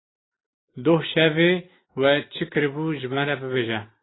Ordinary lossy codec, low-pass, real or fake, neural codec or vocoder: AAC, 16 kbps; 7.2 kHz; real; none